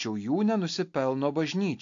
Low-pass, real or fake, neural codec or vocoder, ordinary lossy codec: 7.2 kHz; real; none; AAC, 48 kbps